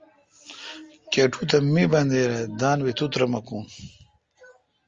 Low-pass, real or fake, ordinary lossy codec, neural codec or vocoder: 7.2 kHz; real; Opus, 32 kbps; none